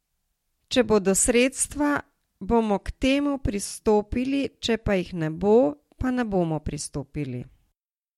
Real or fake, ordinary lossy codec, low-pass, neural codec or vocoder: real; MP3, 64 kbps; 19.8 kHz; none